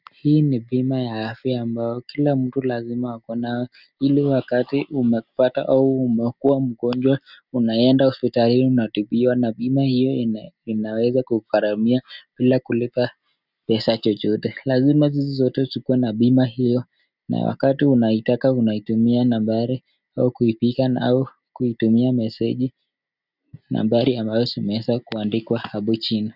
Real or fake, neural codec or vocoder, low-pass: real; none; 5.4 kHz